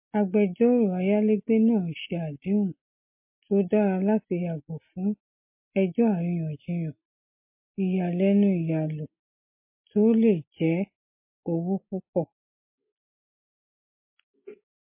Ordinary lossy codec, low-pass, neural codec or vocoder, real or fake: MP3, 24 kbps; 3.6 kHz; none; real